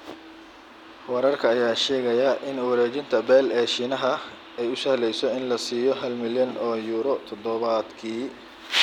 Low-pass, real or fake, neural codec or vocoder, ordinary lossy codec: 19.8 kHz; real; none; none